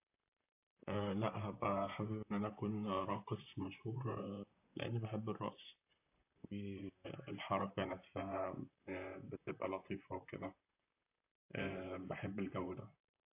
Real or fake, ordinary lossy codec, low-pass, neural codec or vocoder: fake; AAC, 32 kbps; 3.6 kHz; vocoder, 44.1 kHz, 128 mel bands, Pupu-Vocoder